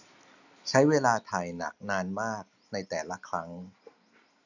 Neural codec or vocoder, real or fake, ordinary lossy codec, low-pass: none; real; none; 7.2 kHz